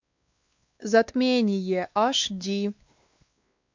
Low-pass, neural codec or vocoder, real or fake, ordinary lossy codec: 7.2 kHz; codec, 16 kHz, 2 kbps, X-Codec, WavLM features, trained on Multilingual LibriSpeech; fake; MP3, 64 kbps